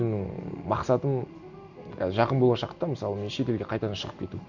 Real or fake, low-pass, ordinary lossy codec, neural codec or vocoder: real; 7.2 kHz; none; none